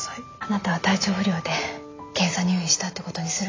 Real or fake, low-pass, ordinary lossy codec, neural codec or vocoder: real; 7.2 kHz; AAC, 32 kbps; none